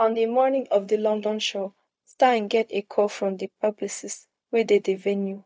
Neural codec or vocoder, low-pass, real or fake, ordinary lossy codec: codec, 16 kHz, 0.4 kbps, LongCat-Audio-Codec; none; fake; none